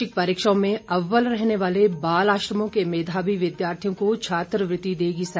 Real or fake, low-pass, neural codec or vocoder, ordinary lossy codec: real; none; none; none